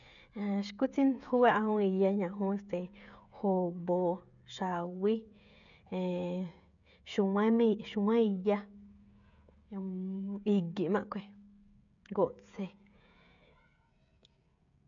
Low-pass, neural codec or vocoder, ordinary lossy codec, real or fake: 7.2 kHz; codec, 16 kHz, 16 kbps, FreqCodec, smaller model; none; fake